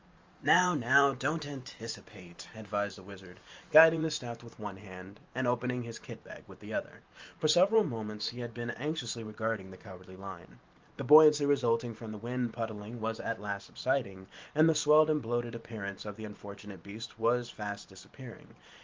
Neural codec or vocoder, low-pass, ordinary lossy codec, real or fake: vocoder, 44.1 kHz, 128 mel bands every 512 samples, BigVGAN v2; 7.2 kHz; Opus, 32 kbps; fake